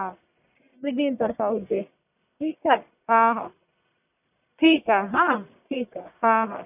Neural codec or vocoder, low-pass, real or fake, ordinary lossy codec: codec, 44.1 kHz, 1.7 kbps, Pupu-Codec; 3.6 kHz; fake; none